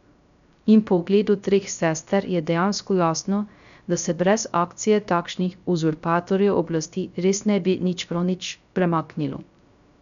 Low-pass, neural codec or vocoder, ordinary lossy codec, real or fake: 7.2 kHz; codec, 16 kHz, 0.3 kbps, FocalCodec; none; fake